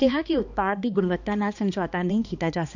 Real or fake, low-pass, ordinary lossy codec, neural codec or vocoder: fake; 7.2 kHz; none; codec, 16 kHz, 2 kbps, X-Codec, HuBERT features, trained on balanced general audio